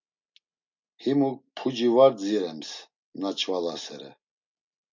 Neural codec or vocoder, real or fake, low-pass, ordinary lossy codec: none; real; 7.2 kHz; MP3, 48 kbps